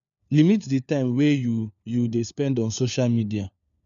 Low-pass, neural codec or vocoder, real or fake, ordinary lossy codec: 7.2 kHz; codec, 16 kHz, 4 kbps, FunCodec, trained on LibriTTS, 50 frames a second; fake; none